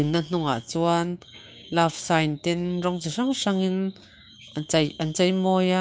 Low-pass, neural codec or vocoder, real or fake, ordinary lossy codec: none; codec, 16 kHz, 6 kbps, DAC; fake; none